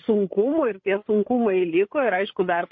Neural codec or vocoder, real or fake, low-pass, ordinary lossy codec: codec, 16 kHz, 8 kbps, FreqCodec, larger model; fake; 7.2 kHz; MP3, 32 kbps